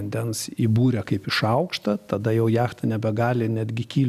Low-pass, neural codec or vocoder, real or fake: 14.4 kHz; none; real